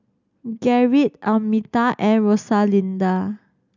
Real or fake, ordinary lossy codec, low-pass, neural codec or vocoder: real; none; 7.2 kHz; none